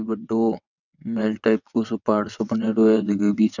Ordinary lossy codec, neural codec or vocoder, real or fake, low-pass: none; vocoder, 22.05 kHz, 80 mel bands, WaveNeXt; fake; 7.2 kHz